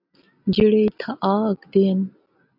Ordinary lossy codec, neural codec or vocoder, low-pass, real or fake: AAC, 48 kbps; none; 5.4 kHz; real